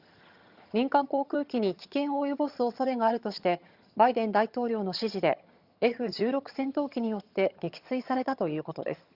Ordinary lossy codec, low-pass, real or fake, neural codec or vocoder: Opus, 64 kbps; 5.4 kHz; fake; vocoder, 22.05 kHz, 80 mel bands, HiFi-GAN